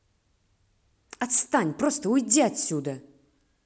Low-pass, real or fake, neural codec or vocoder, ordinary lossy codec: none; real; none; none